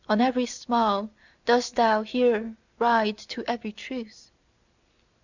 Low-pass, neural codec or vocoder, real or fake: 7.2 kHz; none; real